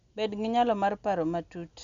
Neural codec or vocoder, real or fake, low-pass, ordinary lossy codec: none; real; 7.2 kHz; none